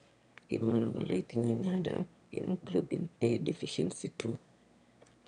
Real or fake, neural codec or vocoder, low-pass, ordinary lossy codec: fake; autoencoder, 22.05 kHz, a latent of 192 numbers a frame, VITS, trained on one speaker; 9.9 kHz; AAC, 96 kbps